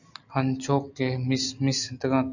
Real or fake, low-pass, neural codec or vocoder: real; 7.2 kHz; none